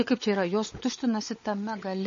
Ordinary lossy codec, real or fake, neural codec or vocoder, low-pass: MP3, 32 kbps; fake; codec, 16 kHz, 16 kbps, FunCodec, trained on LibriTTS, 50 frames a second; 7.2 kHz